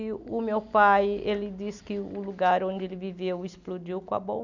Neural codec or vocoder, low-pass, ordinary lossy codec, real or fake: none; 7.2 kHz; none; real